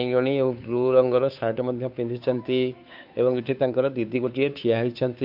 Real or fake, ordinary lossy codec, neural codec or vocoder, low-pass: fake; AAC, 48 kbps; codec, 16 kHz, 2 kbps, FunCodec, trained on Chinese and English, 25 frames a second; 5.4 kHz